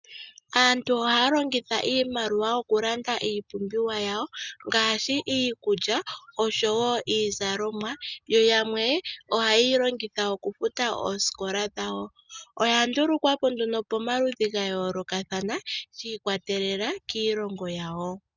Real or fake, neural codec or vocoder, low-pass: real; none; 7.2 kHz